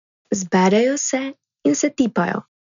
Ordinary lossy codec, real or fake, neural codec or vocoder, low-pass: none; real; none; 7.2 kHz